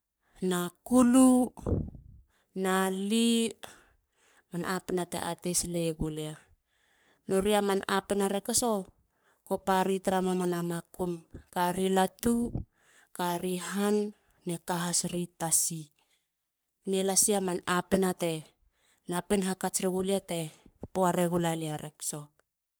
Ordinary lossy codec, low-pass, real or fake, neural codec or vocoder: none; none; fake; codec, 44.1 kHz, 3.4 kbps, Pupu-Codec